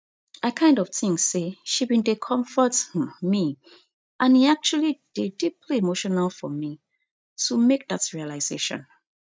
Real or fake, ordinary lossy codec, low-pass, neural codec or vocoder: real; none; none; none